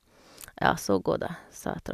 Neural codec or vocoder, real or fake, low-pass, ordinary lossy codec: none; real; 14.4 kHz; none